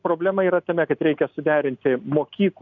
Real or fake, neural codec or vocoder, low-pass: real; none; 7.2 kHz